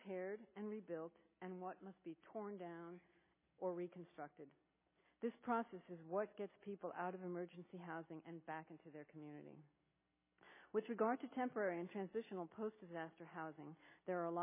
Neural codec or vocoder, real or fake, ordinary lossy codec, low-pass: none; real; MP3, 16 kbps; 3.6 kHz